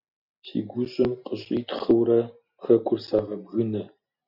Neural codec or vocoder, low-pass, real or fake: none; 5.4 kHz; real